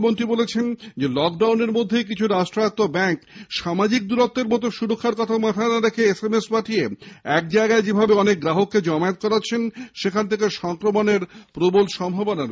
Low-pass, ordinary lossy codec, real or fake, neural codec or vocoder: none; none; real; none